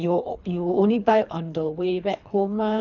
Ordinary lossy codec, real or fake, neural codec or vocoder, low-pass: none; fake; codec, 24 kHz, 3 kbps, HILCodec; 7.2 kHz